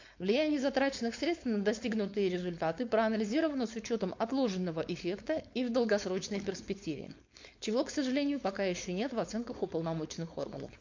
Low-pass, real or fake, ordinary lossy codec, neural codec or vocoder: 7.2 kHz; fake; MP3, 48 kbps; codec, 16 kHz, 4.8 kbps, FACodec